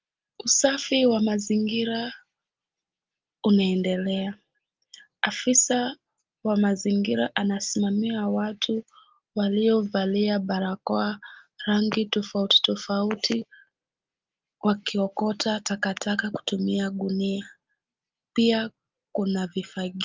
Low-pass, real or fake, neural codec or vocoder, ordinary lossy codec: 7.2 kHz; real; none; Opus, 32 kbps